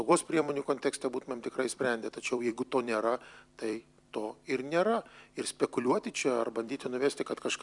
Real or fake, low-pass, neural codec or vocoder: fake; 10.8 kHz; vocoder, 44.1 kHz, 128 mel bands every 256 samples, BigVGAN v2